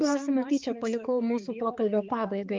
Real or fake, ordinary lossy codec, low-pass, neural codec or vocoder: fake; Opus, 24 kbps; 7.2 kHz; codec, 16 kHz, 4 kbps, X-Codec, HuBERT features, trained on balanced general audio